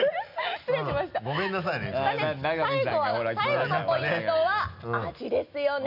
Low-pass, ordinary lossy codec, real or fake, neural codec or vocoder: 5.4 kHz; none; real; none